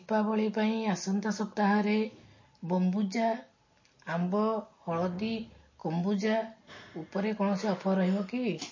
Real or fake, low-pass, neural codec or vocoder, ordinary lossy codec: real; 7.2 kHz; none; MP3, 32 kbps